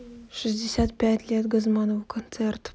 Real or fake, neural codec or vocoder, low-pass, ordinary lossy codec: real; none; none; none